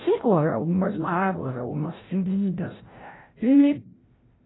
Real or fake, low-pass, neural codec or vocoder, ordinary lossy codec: fake; 7.2 kHz; codec, 16 kHz, 0.5 kbps, FreqCodec, larger model; AAC, 16 kbps